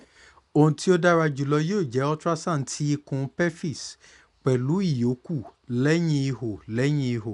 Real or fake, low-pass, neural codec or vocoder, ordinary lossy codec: real; 10.8 kHz; none; none